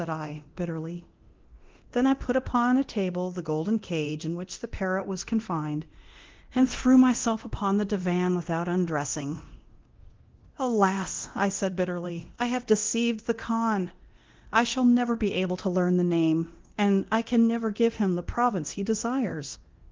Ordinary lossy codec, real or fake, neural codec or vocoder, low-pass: Opus, 16 kbps; fake; codec, 24 kHz, 0.9 kbps, DualCodec; 7.2 kHz